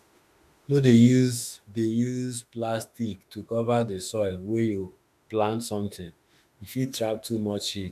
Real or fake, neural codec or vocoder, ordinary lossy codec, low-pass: fake; autoencoder, 48 kHz, 32 numbers a frame, DAC-VAE, trained on Japanese speech; AAC, 96 kbps; 14.4 kHz